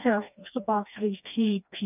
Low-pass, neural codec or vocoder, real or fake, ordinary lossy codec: 3.6 kHz; codec, 16 kHz, 2 kbps, FreqCodec, smaller model; fake; none